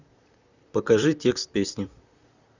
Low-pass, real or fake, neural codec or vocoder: 7.2 kHz; fake; vocoder, 44.1 kHz, 128 mel bands, Pupu-Vocoder